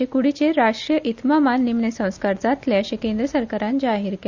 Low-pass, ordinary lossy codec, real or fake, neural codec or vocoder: 7.2 kHz; Opus, 64 kbps; real; none